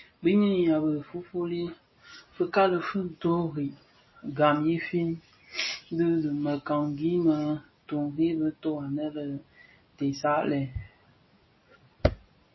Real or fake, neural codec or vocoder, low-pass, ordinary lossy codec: real; none; 7.2 kHz; MP3, 24 kbps